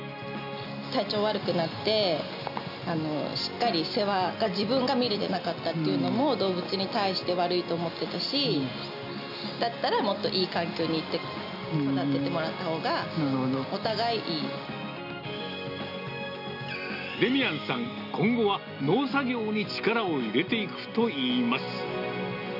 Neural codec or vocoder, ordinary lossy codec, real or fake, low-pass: none; none; real; 5.4 kHz